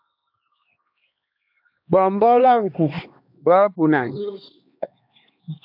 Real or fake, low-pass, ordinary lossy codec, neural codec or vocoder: fake; 5.4 kHz; AAC, 48 kbps; codec, 16 kHz, 2 kbps, X-Codec, HuBERT features, trained on LibriSpeech